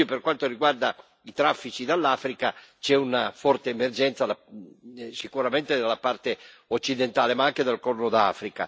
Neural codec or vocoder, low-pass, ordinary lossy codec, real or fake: none; none; none; real